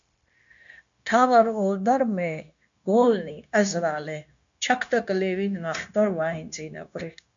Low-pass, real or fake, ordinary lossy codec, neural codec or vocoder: 7.2 kHz; fake; MP3, 64 kbps; codec, 16 kHz, 0.9 kbps, LongCat-Audio-Codec